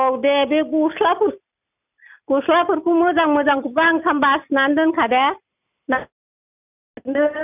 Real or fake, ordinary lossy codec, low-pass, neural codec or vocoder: real; none; 3.6 kHz; none